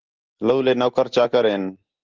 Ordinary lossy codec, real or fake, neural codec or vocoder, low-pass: Opus, 16 kbps; real; none; 7.2 kHz